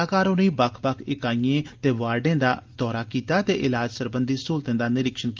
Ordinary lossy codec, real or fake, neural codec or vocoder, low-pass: Opus, 32 kbps; real; none; 7.2 kHz